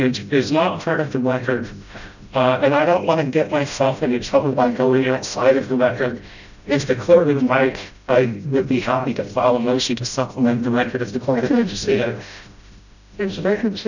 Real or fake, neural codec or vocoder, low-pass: fake; codec, 16 kHz, 0.5 kbps, FreqCodec, smaller model; 7.2 kHz